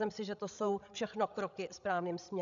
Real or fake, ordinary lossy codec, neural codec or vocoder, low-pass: fake; MP3, 96 kbps; codec, 16 kHz, 16 kbps, FreqCodec, larger model; 7.2 kHz